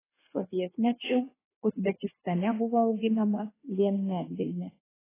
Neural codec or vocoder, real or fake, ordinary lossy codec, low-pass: codec, 16 kHz in and 24 kHz out, 1.1 kbps, FireRedTTS-2 codec; fake; AAC, 16 kbps; 3.6 kHz